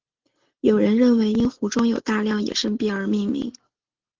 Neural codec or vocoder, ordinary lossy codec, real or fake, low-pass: none; Opus, 16 kbps; real; 7.2 kHz